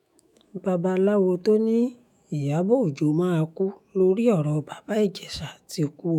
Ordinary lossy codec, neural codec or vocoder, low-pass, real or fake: none; autoencoder, 48 kHz, 128 numbers a frame, DAC-VAE, trained on Japanese speech; 19.8 kHz; fake